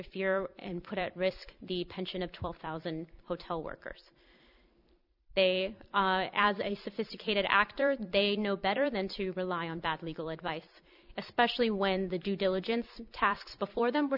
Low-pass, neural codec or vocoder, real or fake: 5.4 kHz; vocoder, 44.1 kHz, 128 mel bands every 256 samples, BigVGAN v2; fake